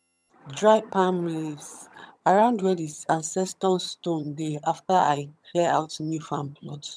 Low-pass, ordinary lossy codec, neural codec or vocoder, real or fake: none; none; vocoder, 22.05 kHz, 80 mel bands, HiFi-GAN; fake